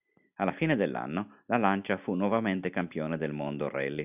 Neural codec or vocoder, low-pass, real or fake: none; 3.6 kHz; real